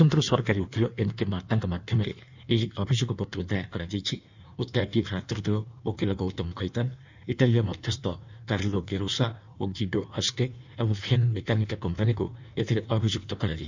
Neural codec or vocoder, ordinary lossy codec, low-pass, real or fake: codec, 16 kHz in and 24 kHz out, 1.1 kbps, FireRedTTS-2 codec; none; 7.2 kHz; fake